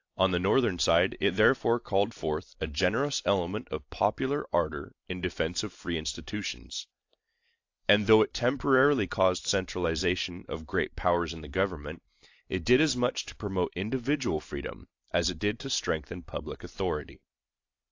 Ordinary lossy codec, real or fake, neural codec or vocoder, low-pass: AAC, 48 kbps; real; none; 7.2 kHz